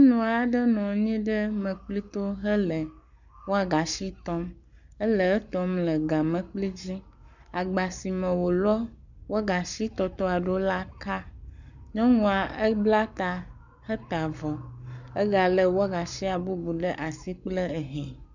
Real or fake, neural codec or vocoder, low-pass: fake; codec, 44.1 kHz, 7.8 kbps, Pupu-Codec; 7.2 kHz